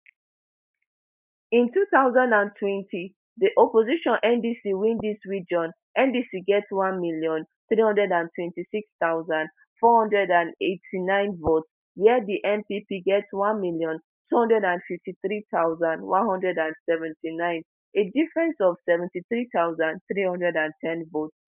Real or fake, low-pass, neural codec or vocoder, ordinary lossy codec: real; 3.6 kHz; none; none